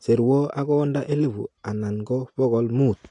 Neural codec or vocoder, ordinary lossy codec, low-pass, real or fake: none; AAC, 48 kbps; 10.8 kHz; real